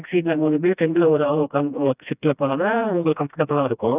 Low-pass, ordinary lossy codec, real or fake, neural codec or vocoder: 3.6 kHz; none; fake; codec, 16 kHz, 1 kbps, FreqCodec, smaller model